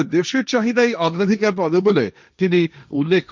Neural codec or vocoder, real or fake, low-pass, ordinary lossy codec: codec, 16 kHz, 1.1 kbps, Voila-Tokenizer; fake; none; none